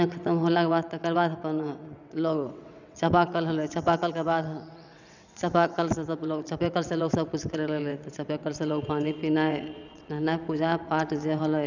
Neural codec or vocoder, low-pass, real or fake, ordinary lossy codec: vocoder, 22.05 kHz, 80 mel bands, WaveNeXt; 7.2 kHz; fake; none